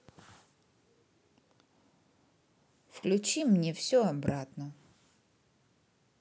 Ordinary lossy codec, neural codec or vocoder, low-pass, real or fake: none; none; none; real